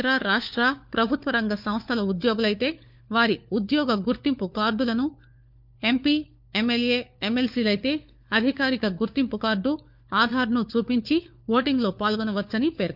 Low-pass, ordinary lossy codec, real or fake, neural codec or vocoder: 5.4 kHz; none; fake; codec, 16 kHz, 4 kbps, FunCodec, trained on LibriTTS, 50 frames a second